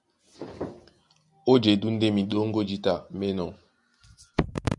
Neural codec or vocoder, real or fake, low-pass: none; real; 10.8 kHz